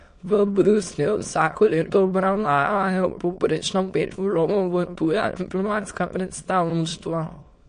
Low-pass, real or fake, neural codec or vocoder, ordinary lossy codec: 9.9 kHz; fake; autoencoder, 22.05 kHz, a latent of 192 numbers a frame, VITS, trained on many speakers; MP3, 48 kbps